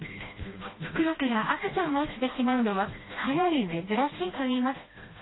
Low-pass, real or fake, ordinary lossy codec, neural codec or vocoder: 7.2 kHz; fake; AAC, 16 kbps; codec, 16 kHz, 1 kbps, FreqCodec, smaller model